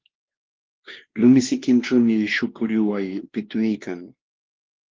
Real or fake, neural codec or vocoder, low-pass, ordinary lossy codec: fake; codec, 16 kHz, 2 kbps, X-Codec, WavLM features, trained on Multilingual LibriSpeech; 7.2 kHz; Opus, 16 kbps